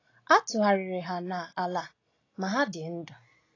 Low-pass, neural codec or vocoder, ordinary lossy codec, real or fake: 7.2 kHz; none; AAC, 32 kbps; real